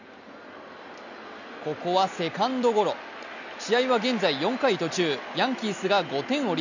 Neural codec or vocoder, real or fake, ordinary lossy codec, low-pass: none; real; none; 7.2 kHz